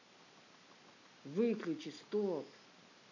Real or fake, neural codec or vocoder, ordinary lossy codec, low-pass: real; none; none; 7.2 kHz